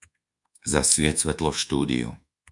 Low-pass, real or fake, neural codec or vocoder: 10.8 kHz; fake; codec, 24 kHz, 1.2 kbps, DualCodec